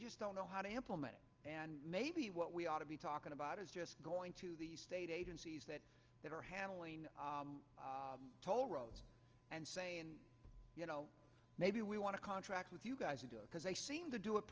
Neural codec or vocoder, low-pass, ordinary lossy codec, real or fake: none; 7.2 kHz; Opus, 24 kbps; real